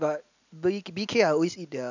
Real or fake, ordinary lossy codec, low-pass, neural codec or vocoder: real; none; 7.2 kHz; none